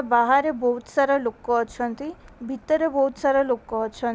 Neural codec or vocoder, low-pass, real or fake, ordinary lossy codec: none; none; real; none